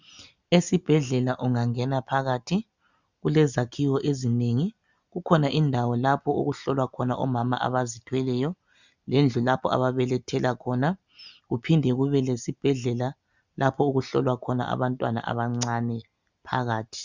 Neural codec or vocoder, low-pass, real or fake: none; 7.2 kHz; real